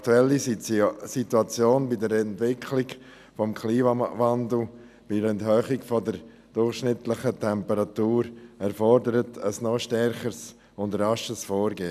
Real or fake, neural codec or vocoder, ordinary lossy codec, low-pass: real; none; none; 14.4 kHz